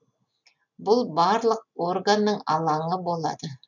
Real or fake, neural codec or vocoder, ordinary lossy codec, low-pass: real; none; none; 7.2 kHz